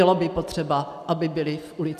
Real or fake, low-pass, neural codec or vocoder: real; 14.4 kHz; none